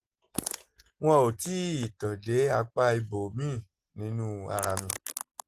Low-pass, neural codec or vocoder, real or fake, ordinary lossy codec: 14.4 kHz; none; real; Opus, 16 kbps